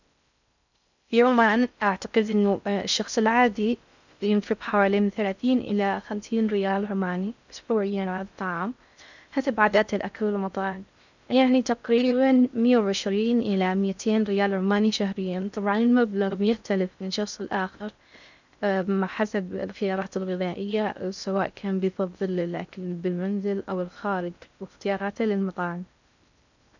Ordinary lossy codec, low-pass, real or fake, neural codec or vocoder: none; 7.2 kHz; fake; codec, 16 kHz in and 24 kHz out, 0.6 kbps, FocalCodec, streaming, 4096 codes